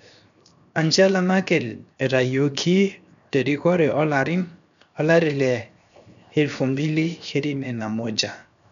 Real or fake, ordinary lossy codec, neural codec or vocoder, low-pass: fake; none; codec, 16 kHz, 0.7 kbps, FocalCodec; 7.2 kHz